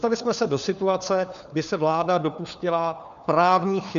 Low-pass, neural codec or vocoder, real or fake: 7.2 kHz; codec, 16 kHz, 4 kbps, FunCodec, trained on LibriTTS, 50 frames a second; fake